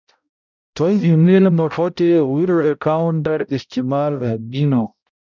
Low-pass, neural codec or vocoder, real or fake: 7.2 kHz; codec, 16 kHz, 0.5 kbps, X-Codec, HuBERT features, trained on balanced general audio; fake